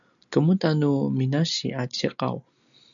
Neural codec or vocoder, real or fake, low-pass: none; real; 7.2 kHz